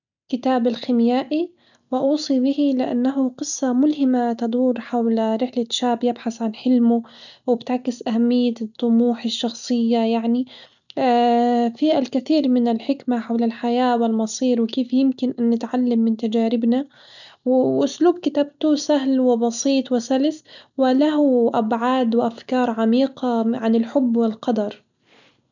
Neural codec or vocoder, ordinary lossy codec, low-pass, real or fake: none; none; 7.2 kHz; real